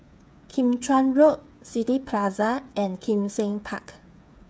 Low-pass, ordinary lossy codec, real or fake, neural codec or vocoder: none; none; fake; codec, 16 kHz, 8 kbps, FreqCodec, smaller model